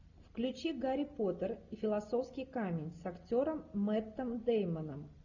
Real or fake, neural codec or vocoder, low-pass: real; none; 7.2 kHz